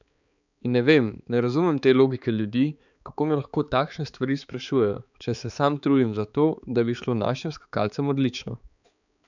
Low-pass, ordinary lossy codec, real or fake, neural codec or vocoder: 7.2 kHz; none; fake; codec, 16 kHz, 4 kbps, X-Codec, HuBERT features, trained on balanced general audio